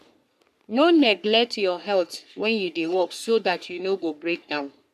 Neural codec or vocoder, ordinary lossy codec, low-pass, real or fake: codec, 44.1 kHz, 3.4 kbps, Pupu-Codec; none; 14.4 kHz; fake